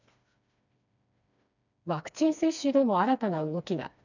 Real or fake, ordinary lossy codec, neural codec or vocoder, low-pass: fake; none; codec, 16 kHz, 2 kbps, FreqCodec, smaller model; 7.2 kHz